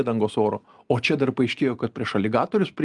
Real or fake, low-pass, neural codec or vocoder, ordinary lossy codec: real; 10.8 kHz; none; Opus, 32 kbps